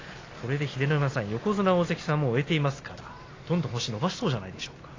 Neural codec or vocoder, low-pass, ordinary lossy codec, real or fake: none; 7.2 kHz; AAC, 32 kbps; real